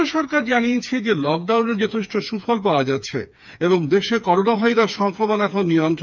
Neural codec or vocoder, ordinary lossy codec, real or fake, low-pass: codec, 16 kHz, 4 kbps, FreqCodec, smaller model; none; fake; 7.2 kHz